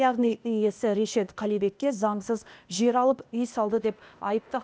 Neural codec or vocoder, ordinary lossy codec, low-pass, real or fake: codec, 16 kHz, 0.8 kbps, ZipCodec; none; none; fake